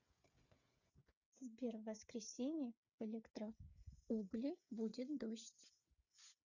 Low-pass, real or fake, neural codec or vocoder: 7.2 kHz; fake; codec, 16 kHz, 8 kbps, FreqCodec, smaller model